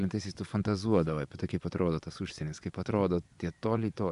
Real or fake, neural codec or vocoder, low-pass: fake; vocoder, 24 kHz, 100 mel bands, Vocos; 10.8 kHz